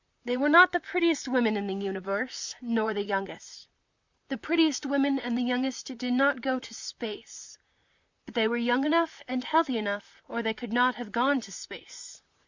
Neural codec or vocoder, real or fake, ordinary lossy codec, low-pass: vocoder, 44.1 kHz, 128 mel bands, Pupu-Vocoder; fake; Opus, 64 kbps; 7.2 kHz